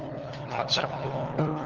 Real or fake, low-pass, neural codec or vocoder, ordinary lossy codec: fake; 7.2 kHz; codec, 16 kHz, 16 kbps, FunCodec, trained on LibriTTS, 50 frames a second; Opus, 16 kbps